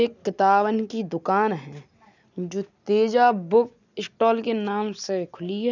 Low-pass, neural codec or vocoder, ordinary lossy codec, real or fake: 7.2 kHz; none; none; real